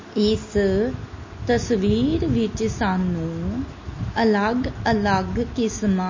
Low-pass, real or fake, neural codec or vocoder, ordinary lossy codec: 7.2 kHz; real; none; MP3, 32 kbps